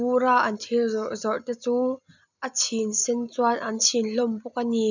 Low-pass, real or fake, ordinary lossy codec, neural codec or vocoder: 7.2 kHz; real; none; none